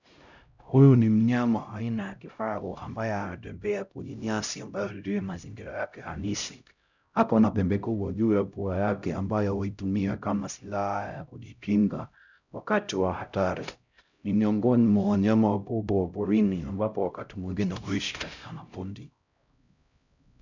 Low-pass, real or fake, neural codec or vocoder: 7.2 kHz; fake; codec, 16 kHz, 0.5 kbps, X-Codec, HuBERT features, trained on LibriSpeech